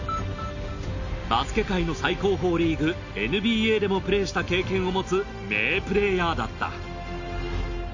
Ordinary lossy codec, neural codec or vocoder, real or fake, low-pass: AAC, 48 kbps; none; real; 7.2 kHz